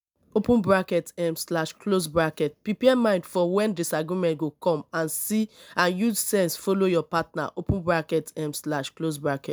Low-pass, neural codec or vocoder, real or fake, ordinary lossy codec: none; none; real; none